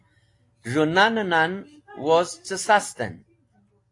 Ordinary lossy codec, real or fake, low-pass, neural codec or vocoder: AAC, 48 kbps; real; 10.8 kHz; none